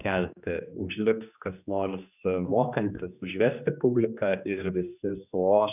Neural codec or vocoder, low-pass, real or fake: codec, 16 kHz, 2 kbps, X-Codec, HuBERT features, trained on general audio; 3.6 kHz; fake